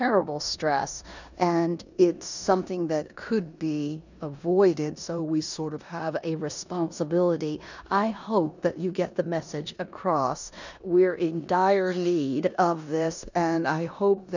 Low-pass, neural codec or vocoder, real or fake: 7.2 kHz; codec, 16 kHz in and 24 kHz out, 0.9 kbps, LongCat-Audio-Codec, four codebook decoder; fake